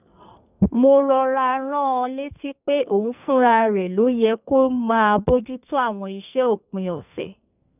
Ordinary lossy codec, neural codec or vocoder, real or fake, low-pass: none; codec, 44.1 kHz, 2.6 kbps, SNAC; fake; 3.6 kHz